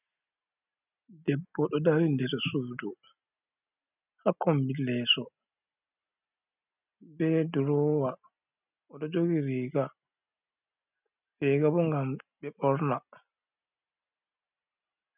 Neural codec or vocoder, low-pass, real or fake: none; 3.6 kHz; real